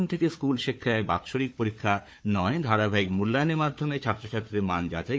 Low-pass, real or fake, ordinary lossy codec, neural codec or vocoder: none; fake; none; codec, 16 kHz, 4 kbps, FunCodec, trained on Chinese and English, 50 frames a second